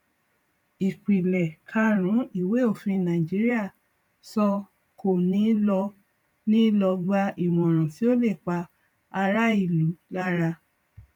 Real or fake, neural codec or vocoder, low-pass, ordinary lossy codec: fake; vocoder, 44.1 kHz, 128 mel bands every 512 samples, BigVGAN v2; 19.8 kHz; none